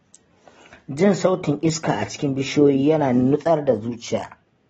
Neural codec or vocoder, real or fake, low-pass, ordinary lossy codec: none; real; 14.4 kHz; AAC, 24 kbps